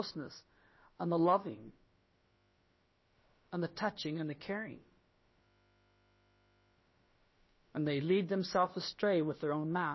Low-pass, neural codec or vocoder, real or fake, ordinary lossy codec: 7.2 kHz; codec, 16 kHz, about 1 kbps, DyCAST, with the encoder's durations; fake; MP3, 24 kbps